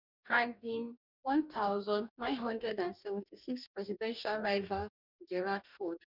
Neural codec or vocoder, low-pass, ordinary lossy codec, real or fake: codec, 44.1 kHz, 2.6 kbps, DAC; 5.4 kHz; none; fake